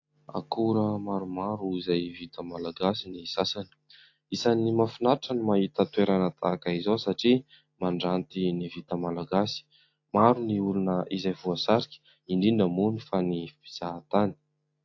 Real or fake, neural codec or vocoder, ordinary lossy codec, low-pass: real; none; AAC, 48 kbps; 7.2 kHz